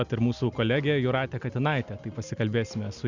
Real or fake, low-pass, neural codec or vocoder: real; 7.2 kHz; none